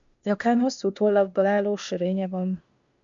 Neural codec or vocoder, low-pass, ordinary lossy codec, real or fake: codec, 16 kHz, 0.8 kbps, ZipCodec; 7.2 kHz; MP3, 64 kbps; fake